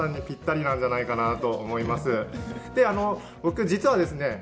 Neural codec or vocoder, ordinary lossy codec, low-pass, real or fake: none; none; none; real